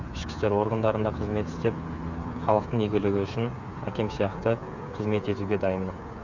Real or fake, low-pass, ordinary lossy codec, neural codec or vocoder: fake; 7.2 kHz; none; codec, 16 kHz, 8 kbps, FreqCodec, smaller model